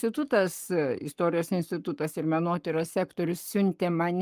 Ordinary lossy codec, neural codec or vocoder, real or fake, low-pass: Opus, 32 kbps; codec, 44.1 kHz, 7.8 kbps, Pupu-Codec; fake; 14.4 kHz